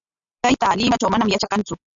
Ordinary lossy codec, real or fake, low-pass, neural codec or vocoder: AAC, 64 kbps; real; 7.2 kHz; none